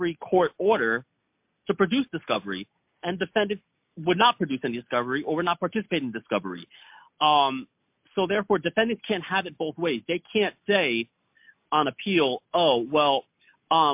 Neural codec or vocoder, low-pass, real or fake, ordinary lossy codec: none; 3.6 kHz; real; MP3, 32 kbps